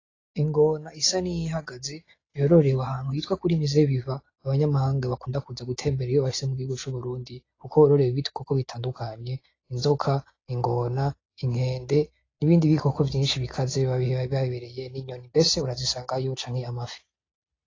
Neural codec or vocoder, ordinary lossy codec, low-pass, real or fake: none; AAC, 32 kbps; 7.2 kHz; real